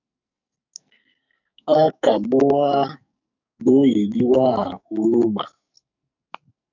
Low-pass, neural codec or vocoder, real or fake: 7.2 kHz; codec, 44.1 kHz, 2.6 kbps, SNAC; fake